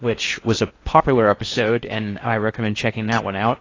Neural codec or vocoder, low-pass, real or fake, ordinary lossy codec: codec, 16 kHz in and 24 kHz out, 0.6 kbps, FocalCodec, streaming, 4096 codes; 7.2 kHz; fake; AAC, 32 kbps